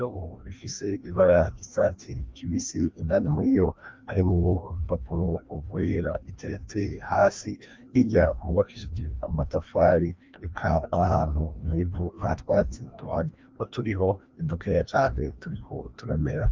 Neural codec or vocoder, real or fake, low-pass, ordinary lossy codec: codec, 16 kHz, 1 kbps, FreqCodec, larger model; fake; 7.2 kHz; Opus, 32 kbps